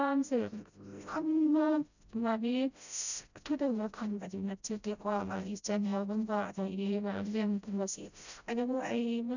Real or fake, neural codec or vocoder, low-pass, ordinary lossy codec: fake; codec, 16 kHz, 0.5 kbps, FreqCodec, smaller model; 7.2 kHz; none